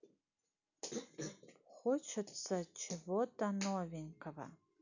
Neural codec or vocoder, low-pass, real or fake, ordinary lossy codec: none; 7.2 kHz; real; none